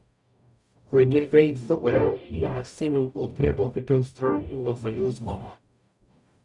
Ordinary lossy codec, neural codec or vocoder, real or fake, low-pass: none; codec, 44.1 kHz, 0.9 kbps, DAC; fake; 10.8 kHz